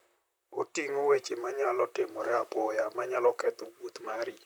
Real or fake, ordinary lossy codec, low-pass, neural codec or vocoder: fake; none; none; vocoder, 44.1 kHz, 128 mel bands, Pupu-Vocoder